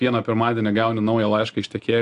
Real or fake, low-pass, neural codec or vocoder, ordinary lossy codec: real; 10.8 kHz; none; AAC, 64 kbps